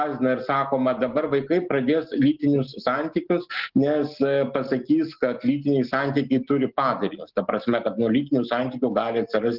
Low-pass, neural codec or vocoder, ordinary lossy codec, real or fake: 5.4 kHz; none; Opus, 16 kbps; real